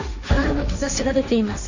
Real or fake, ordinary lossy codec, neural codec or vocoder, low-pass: fake; none; codec, 16 kHz, 1.1 kbps, Voila-Tokenizer; 7.2 kHz